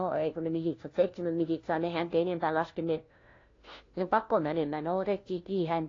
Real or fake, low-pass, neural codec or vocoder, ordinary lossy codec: fake; 7.2 kHz; codec, 16 kHz, 1 kbps, FunCodec, trained on LibriTTS, 50 frames a second; AAC, 32 kbps